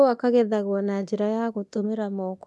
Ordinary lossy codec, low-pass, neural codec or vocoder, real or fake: none; none; codec, 24 kHz, 0.9 kbps, DualCodec; fake